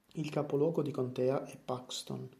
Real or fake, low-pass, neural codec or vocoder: real; 14.4 kHz; none